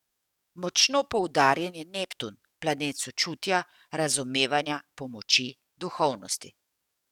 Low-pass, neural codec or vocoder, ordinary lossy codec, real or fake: 19.8 kHz; codec, 44.1 kHz, 7.8 kbps, DAC; none; fake